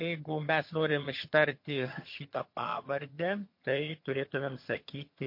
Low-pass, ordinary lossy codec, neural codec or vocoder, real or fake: 5.4 kHz; MP3, 32 kbps; vocoder, 22.05 kHz, 80 mel bands, HiFi-GAN; fake